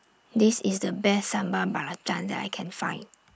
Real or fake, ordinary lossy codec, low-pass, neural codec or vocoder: real; none; none; none